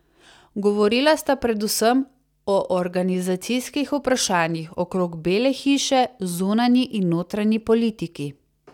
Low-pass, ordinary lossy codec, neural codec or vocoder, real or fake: 19.8 kHz; none; none; real